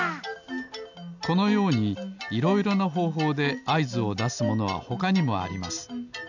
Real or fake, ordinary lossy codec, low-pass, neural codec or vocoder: real; none; 7.2 kHz; none